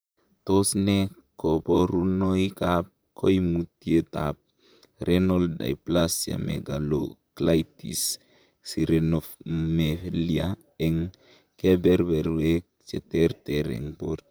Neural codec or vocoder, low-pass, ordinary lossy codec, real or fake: vocoder, 44.1 kHz, 128 mel bands, Pupu-Vocoder; none; none; fake